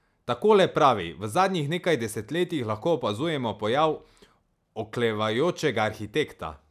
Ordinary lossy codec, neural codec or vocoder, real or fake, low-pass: none; none; real; 14.4 kHz